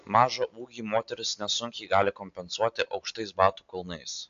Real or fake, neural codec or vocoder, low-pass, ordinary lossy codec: real; none; 7.2 kHz; AAC, 64 kbps